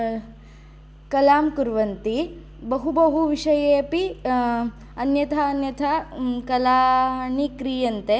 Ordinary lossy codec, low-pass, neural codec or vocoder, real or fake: none; none; none; real